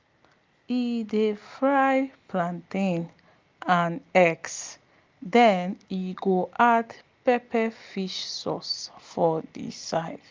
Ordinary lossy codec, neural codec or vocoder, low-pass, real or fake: Opus, 32 kbps; none; 7.2 kHz; real